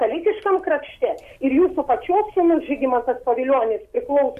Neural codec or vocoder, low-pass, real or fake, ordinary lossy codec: none; 14.4 kHz; real; MP3, 64 kbps